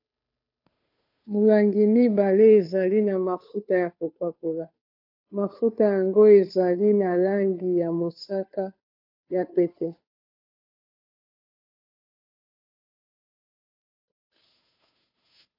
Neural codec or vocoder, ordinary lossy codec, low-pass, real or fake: codec, 16 kHz, 2 kbps, FunCodec, trained on Chinese and English, 25 frames a second; AAC, 48 kbps; 5.4 kHz; fake